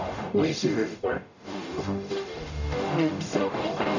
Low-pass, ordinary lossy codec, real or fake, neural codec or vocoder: 7.2 kHz; none; fake; codec, 44.1 kHz, 0.9 kbps, DAC